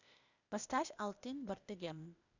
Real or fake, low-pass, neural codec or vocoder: fake; 7.2 kHz; codec, 16 kHz, 0.8 kbps, ZipCodec